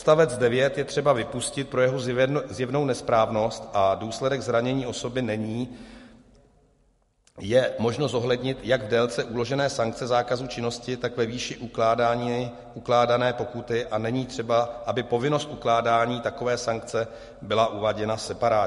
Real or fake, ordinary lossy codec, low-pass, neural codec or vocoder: real; MP3, 48 kbps; 14.4 kHz; none